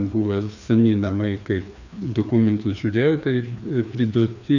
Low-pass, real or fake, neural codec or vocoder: 7.2 kHz; fake; codec, 16 kHz, 2 kbps, FreqCodec, larger model